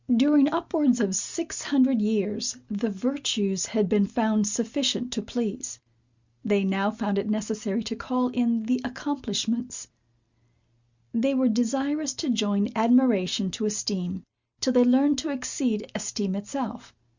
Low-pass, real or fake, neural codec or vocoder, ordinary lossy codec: 7.2 kHz; real; none; Opus, 64 kbps